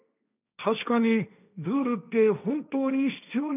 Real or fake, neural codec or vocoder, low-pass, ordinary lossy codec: fake; codec, 16 kHz, 1.1 kbps, Voila-Tokenizer; 3.6 kHz; none